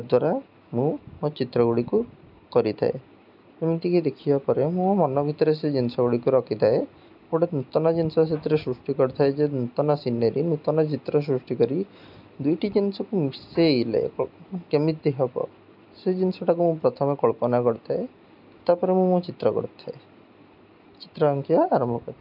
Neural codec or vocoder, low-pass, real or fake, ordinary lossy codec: none; 5.4 kHz; real; none